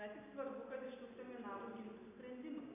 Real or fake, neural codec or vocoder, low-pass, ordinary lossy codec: real; none; 3.6 kHz; MP3, 32 kbps